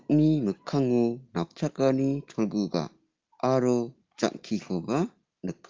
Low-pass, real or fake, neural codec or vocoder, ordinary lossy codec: 7.2 kHz; real; none; Opus, 24 kbps